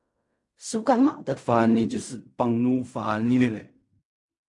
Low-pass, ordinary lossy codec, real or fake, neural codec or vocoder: 10.8 kHz; AAC, 64 kbps; fake; codec, 16 kHz in and 24 kHz out, 0.4 kbps, LongCat-Audio-Codec, fine tuned four codebook decoder